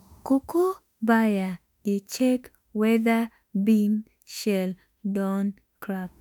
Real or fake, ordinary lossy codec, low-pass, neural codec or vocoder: fake; none; none; autoencoder, 48 kHz, 32 numbers a frame, DAC-VAE, trained on Japanese speech